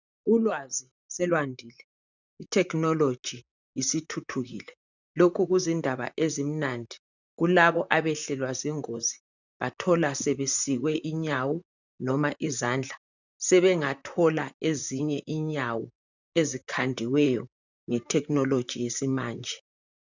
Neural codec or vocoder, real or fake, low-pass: vocoder, 44.1 kHz, 128 mel bands, Pupu-Vocoder; fake; 7.2 kHz